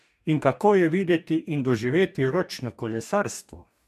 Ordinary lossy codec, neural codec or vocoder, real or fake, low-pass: MP3, 96 kbps; codec, 44.1 kHz, 2.6 kbps, DAC; fake; 14.4 kHz